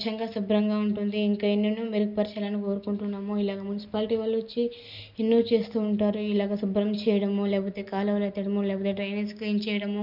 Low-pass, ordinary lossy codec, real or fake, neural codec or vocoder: 5.4 kHz; none; real; none